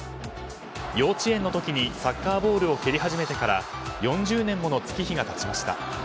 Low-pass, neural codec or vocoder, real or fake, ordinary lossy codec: none; none; real; none